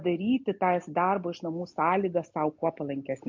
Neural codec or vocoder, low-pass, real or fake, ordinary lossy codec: none; 7.2 kHz; real; MP3, 48 kbps